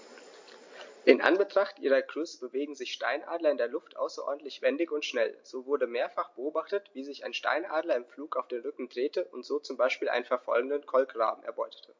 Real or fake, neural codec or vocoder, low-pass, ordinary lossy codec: real; none; 7.2 kHz; MP3, 48 kbps